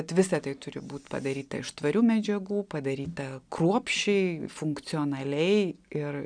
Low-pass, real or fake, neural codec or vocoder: 9.9 kHz; real; none